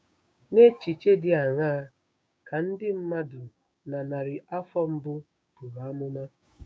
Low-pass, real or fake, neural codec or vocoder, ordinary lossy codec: none; fake; codec, 16 kHz, 6 kbps, DAC; none